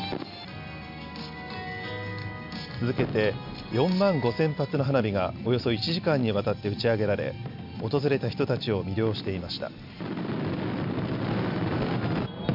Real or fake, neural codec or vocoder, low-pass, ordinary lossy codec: real; none; 5.4 kHz; MP3, 48 kbps